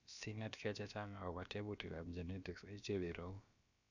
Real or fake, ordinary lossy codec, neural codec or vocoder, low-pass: fake; none; codec, 16 kHz, about 1 kbps, DyCAST, with the encoder's durations; 7.2 kHz